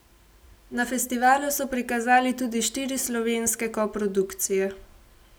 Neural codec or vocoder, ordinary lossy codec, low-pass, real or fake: none; none; none; real